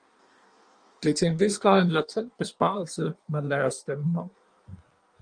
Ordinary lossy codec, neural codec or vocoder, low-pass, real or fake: Opus, 32 kbps; codec, 16 kHz in and 24 kHz out, 1.1 kbps, FireRedTTS-2 codec; 9.9 kHz; fake